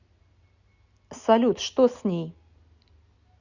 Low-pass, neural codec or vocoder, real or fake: 7.2 kHz; none; real